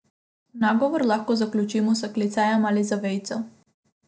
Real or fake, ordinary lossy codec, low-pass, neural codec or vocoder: real; none; none; none